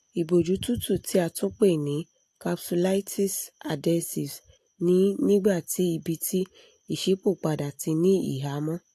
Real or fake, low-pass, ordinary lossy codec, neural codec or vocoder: real; 14.4 kHz; AAC, 64 kbps; none